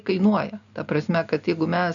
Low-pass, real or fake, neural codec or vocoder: 7.2 kHz; real; none